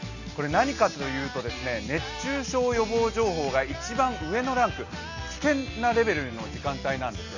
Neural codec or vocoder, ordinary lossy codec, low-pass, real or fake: none; none; 7.2 kHz; real